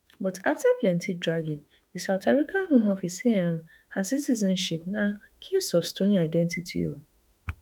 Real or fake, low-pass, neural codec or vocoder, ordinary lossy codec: fake; none; autoencoder, 48 kHz, 32 numbers a frame, DAC-VAE, trained on Japanese speech; none